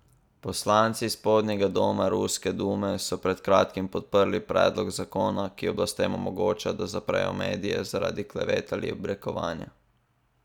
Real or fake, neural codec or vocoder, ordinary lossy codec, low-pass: real; none; none; 19.8 kHz